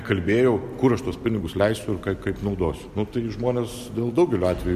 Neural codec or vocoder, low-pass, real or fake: none; 14.4 kHz; real